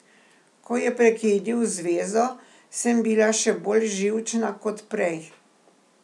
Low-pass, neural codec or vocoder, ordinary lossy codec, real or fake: none; none; none; real